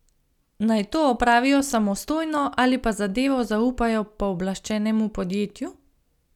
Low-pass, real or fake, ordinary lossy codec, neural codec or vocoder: 19.8 kHz; real; none; none